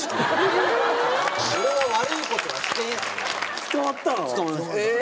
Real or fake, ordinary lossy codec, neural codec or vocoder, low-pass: real; none; none; none